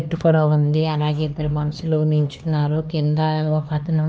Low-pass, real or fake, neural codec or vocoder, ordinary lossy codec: none; fake; codec, 16 kHz, 2 kbps, X-Codec, HuBERT features, trained on LibriSpeech; none